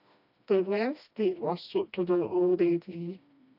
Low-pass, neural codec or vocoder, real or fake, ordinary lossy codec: 5.4 kHz; codec, 16 kHz, 1 kbps, FreqCodec, smaller model; fake; AAC, 48 kbps